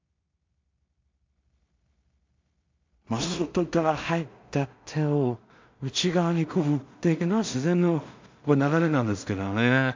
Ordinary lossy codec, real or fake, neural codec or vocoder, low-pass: MP3, 64 kbps; fake; codec, 16 kHz in and 24 kHz out, 0.4 kbps, LongCat-Audio-Codec, two codebook decoder; 7.2 kHz